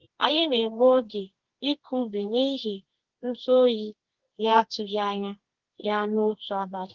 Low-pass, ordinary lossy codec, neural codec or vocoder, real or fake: 7.2 kHz; Opus, 16 kbps; codec, 24 kHz, 0.9 kbps, WavTokenizer, medium music audio release; fake